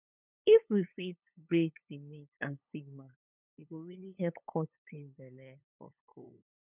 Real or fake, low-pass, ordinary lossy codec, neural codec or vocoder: fake; 3.6 kHz; none; codec, 16 kHz, 8 kbps, FunCodec, trained on LibriTTS, 25 frames a second